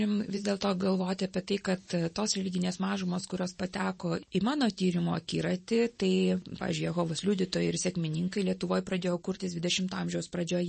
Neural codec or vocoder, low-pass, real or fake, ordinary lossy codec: vocoder, 44.1 kHz, 128 mel bands every 512 samples, BigVGAN v2; 10.8 kHz; fake; MP3, 32 kbps